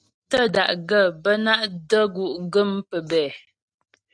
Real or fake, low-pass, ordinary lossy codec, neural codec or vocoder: real; 9.9 kHz; AAC, 64 kbps; none